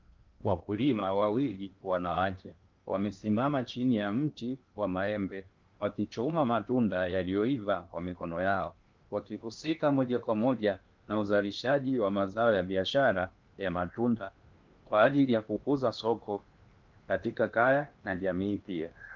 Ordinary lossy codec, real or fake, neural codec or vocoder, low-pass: Opus, 24 kbps; fake; codec, 16 kHz in and 24 kHz out, 0.8 kbps, FocalCodec, streaming, 65536 codes; 7.2 kHz